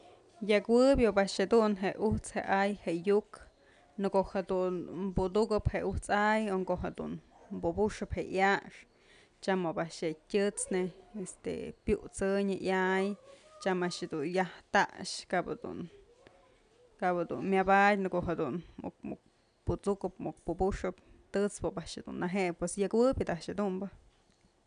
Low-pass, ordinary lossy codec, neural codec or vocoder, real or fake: 9.9 kHz; none; none; real